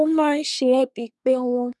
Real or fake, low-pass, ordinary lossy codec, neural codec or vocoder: fake; none; none; codec, 24 kHz, 1 kbps, SNAC